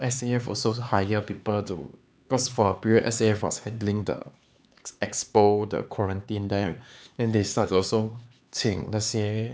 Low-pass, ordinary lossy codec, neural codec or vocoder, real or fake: none; none; codec, 16 kHz, 4 kbps, X-Codec, HuBERT features, trained on LibriSpeech; fake